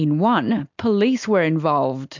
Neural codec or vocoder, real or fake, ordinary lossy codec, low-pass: none; real; MP3, 64 kbps; 7.2 kHz